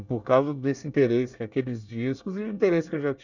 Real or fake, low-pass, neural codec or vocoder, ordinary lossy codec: fake; 7.2 kHz; codec, 24 kHz, 1 kbps, SNAC; none